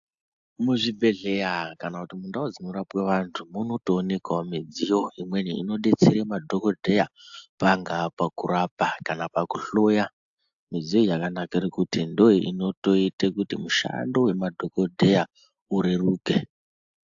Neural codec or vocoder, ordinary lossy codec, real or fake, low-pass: none; AAC, 64 kbps; real; 7.2 kHz